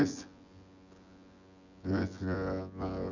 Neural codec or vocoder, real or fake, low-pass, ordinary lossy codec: vocoder, 24 kHz, 100 mel bands, Vocos; fake; 7.2 kHz; Opus, 64 kbps